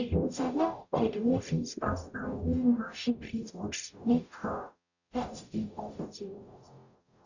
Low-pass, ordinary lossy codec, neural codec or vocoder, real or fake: 7.2 kHz; none; codec, 44.1 kHz, 0.9 kbps, DAC; fake